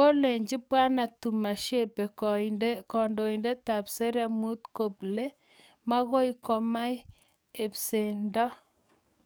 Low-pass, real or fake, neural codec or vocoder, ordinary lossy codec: none; fake; codec, 44.1 kHz, 7.8 kbps, DAC; none